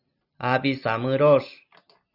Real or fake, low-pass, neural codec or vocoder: real; 5.4 kHz; none